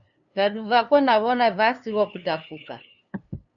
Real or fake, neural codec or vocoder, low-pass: fake; codec, 16 kHz, 2 kbps, FunCodec, trained on LibriTTS, 25 frames a second; 7.2 kHz